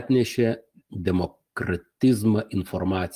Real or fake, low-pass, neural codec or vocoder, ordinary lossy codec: real; 14.4 kHz; none; Opus, 32 kbps